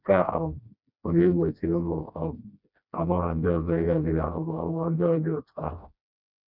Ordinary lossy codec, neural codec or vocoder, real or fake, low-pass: none; codec, 16 kHz, 1 kbps, FreqCodec, smaller model; fake; 5.4 kHz